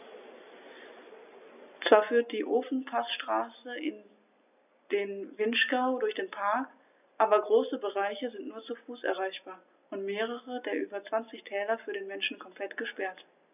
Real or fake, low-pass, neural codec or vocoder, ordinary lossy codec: real; 3.6 kHz; none; none